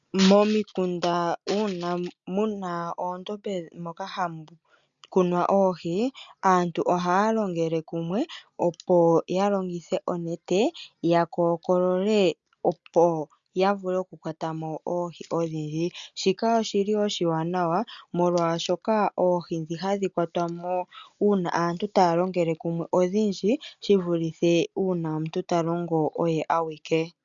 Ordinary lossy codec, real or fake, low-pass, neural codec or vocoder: MP3, 96 kbps; real; 7.2 kHz; none